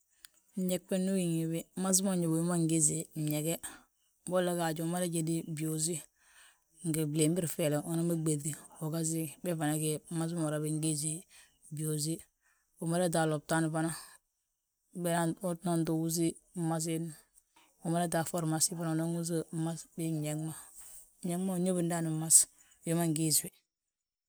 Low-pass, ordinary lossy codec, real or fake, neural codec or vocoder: none; none; real; none